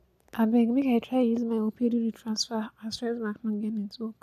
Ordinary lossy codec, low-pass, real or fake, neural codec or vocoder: none; 14.4 kHz; real; none